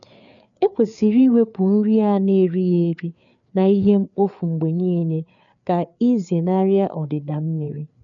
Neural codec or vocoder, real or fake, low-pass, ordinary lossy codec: codec, 16 kHz, 4 kbps, FreqCodec, larger model; fake; 7.2 kHz; none